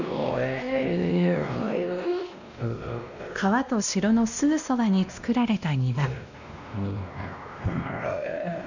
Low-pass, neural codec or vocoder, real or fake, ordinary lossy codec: 7.2 kHz; codec, 16 kHz, 1 kbps, X-Codec, WavLM features, trained on Multilingual LibriSpeech; fake; none